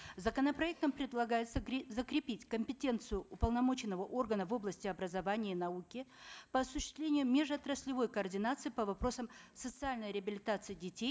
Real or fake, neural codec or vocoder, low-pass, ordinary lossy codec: real; none; none; none